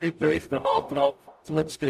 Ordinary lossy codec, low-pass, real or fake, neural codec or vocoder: MP3, 96 kbps; 14.4 kHz; fake; codec, 44.1 kHz, 0.9 kbps, DAC